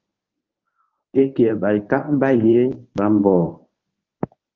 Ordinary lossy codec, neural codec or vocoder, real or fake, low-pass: Opus, 24 kbps; codec, 24 kHz, 0.9 kbps, WavTokenizer, medium speech release version 1; fake; 7.2 kHz